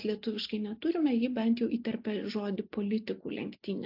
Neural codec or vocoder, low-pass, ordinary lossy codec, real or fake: none; 5.4 kHz; AAC, 48 kbps; real